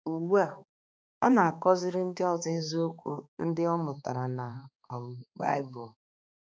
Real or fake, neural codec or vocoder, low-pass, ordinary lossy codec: fake; codec, 16 kHz, 2 kbps, X-Codec, HuBERT features, trained on balanced general audio; none; none